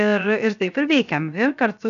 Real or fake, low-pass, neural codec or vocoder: fake; 7.2 kHz; codec, 16 kHz, 0.7 kbps, FocalCodec